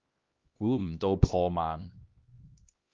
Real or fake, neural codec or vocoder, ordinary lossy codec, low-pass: fake; codec, 16 kHz, 1 kbps, X-Codec, HuBERT features, trained on LibriSpeech; Opus, 32 kbps; 7.2 kHz